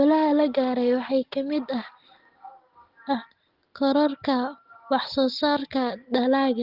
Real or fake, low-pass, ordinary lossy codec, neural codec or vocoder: real; 5.4 kHz; Opus, 16 kbps; none